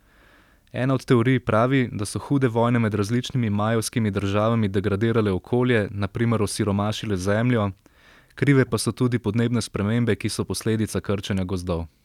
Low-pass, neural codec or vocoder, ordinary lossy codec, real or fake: 19.8 kHz; none; none; real